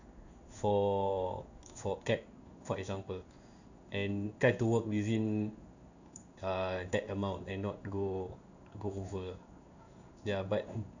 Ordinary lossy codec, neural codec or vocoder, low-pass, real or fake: none; codec, 16 kHz in and 24 kHz out, 1 kbps, XY-Tokenizer; 7.2 kHz; fake